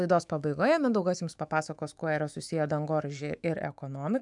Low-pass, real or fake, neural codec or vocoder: 10.8 kHz; fake; autoencoder, 48 kHz, 128 numbers a frame, DAC-VAE, trained on Japanese speech